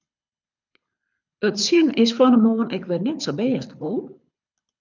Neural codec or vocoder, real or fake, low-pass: codec, 24 kHz, 6 kbps, HILCodec; fake; 7.2 kHz